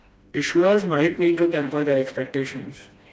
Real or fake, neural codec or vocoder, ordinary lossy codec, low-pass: fake; codec, 16 kHz, 1 kbps, FreqCodec, smaller model; none; none